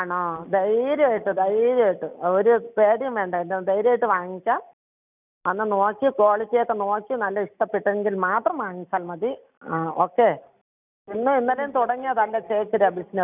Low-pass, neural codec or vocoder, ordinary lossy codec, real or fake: 3.6 kHz; none; none; real